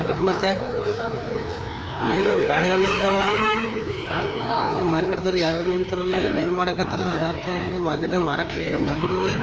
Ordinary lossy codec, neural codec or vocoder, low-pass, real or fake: none; codec, 16 kHz, 2 kbps, FreqCodec, larger model; none; fake